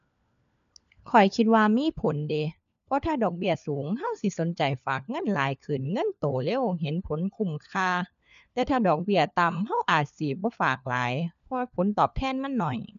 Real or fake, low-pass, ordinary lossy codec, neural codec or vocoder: fake; 7.2 kHz; none; codec, 16 kHz, 4 kbps, FunCodec, trained on LibriTTS, 50 frames a second